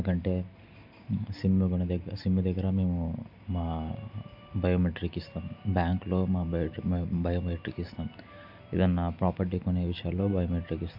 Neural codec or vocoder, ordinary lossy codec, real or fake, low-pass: none; MP3, 48 kbps; real; 5.4 kHz